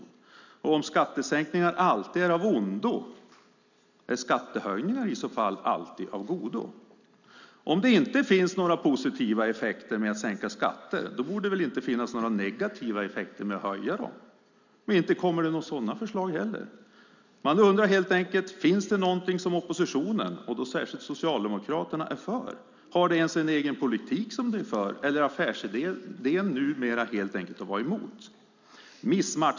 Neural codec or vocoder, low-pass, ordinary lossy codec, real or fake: none; 7.2 kHz; none; real